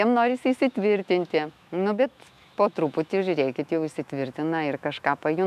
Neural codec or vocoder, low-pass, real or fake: autoencoder, 48 kHz, 128 numbers a frame, DAC-VAE, trained on Japanese speech; 14.4 kHz; fake